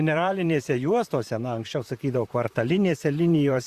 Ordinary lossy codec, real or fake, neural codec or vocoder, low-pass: Opus, 64 kbps; fake; vocoder, 44.1 kHz, 128 mel bands, Pupu-Vocoder; 14.4 kHz